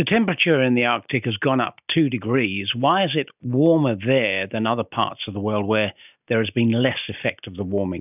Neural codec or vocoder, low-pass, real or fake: none; 3.6 kHz; real